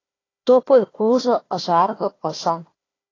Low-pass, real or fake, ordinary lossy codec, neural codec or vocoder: 7.2 kHz; fake; AAC, 32 kbps; codec, 16 kHz, 1 kbps, FunCodec, trained on Chinese and English, 50 frames a second